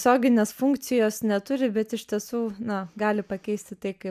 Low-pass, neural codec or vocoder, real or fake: 14.4 kHz; none; real